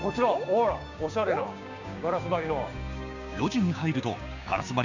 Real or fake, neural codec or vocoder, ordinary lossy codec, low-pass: fake; codec, 16 kHz, 6 kbps, DAC; none; 7.2 kHz